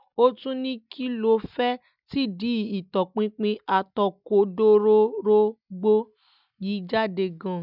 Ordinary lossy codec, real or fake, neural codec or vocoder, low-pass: none; real; none; 5.4 kHz